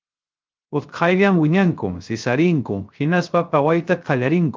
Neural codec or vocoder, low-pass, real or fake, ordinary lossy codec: codec, 16 kHz, 0.3 kbps, FocalCodec; 7.2 kHz; fake; Opus, 32 kbps